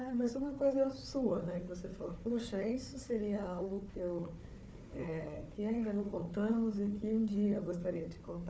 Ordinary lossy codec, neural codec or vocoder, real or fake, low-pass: none; codec, 16 kHz, 4 kbps, FunCodec, trained on Chinese and English, 50 frames a second; fake; none